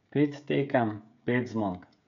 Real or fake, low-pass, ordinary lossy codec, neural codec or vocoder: fake; 7.2 kHz; MP3, 64 kbps; codec, 16 kHz, 16 kbps, FreqCodec, smaller model